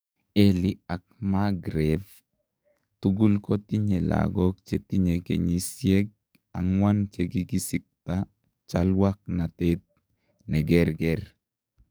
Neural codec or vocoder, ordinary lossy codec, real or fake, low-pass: codec, 44.1 kHz, 7.8 kbps, DAC; none; fake; none